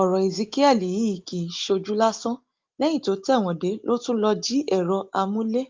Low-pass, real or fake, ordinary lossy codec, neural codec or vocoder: 7.2 kHz; real; Opus, 32 kbps; none